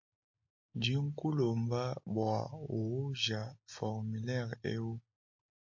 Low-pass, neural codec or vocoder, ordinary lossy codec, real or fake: 7.2 kHz; none; AAC, 48 kbps; real